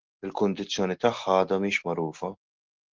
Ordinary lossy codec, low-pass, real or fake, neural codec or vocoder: Opus, 16 kbps; 7.2 kHz; real; none